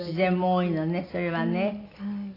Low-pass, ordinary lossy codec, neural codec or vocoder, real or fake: 5.4 kHz; AAC, 24 kbps; none; real